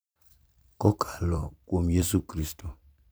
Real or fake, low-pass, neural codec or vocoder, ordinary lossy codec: fake; none; vocoder, 44.1 kHz, 128 mel bands every 512 samples, BigVGAN v2; none